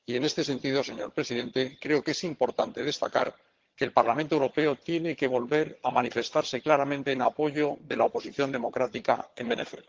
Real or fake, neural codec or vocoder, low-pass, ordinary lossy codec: fake; vocoder, 22.05 kHz, 80 mel bands, HiFi-GAN; 7.2 kHz; Opus, 16 kbps